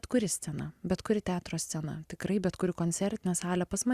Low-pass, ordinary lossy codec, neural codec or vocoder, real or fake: 14.4 kHz; Opus, 64 kbps; none; real